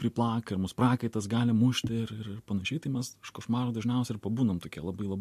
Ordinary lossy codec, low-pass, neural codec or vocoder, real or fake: MP3, 64 kbps; 14.4 kHz; none; real